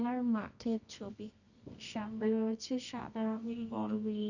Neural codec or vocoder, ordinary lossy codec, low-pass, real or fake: codec, 24 kHz, 0.9 kbps, WavTokenizer, medium music audio release; none; 7.2 kHz; fake